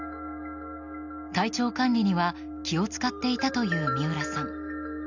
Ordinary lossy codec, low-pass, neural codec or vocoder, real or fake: none; 7.2 kHz; none; real